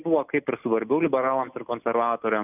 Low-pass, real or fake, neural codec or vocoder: 3.6 kHz; real; none